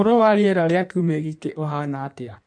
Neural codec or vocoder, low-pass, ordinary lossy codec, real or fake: codec, 16 kHz in and 24 kHz out, 1.1 kbps, FireRedTTS-2 codec; 9.9 kHz; none; fake